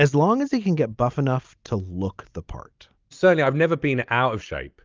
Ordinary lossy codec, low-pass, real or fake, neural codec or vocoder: Opus, 24 kbps; 7.2 kHz; real; none